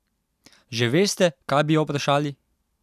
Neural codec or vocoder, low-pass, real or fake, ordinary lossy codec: none; 14.4 kHz; real; none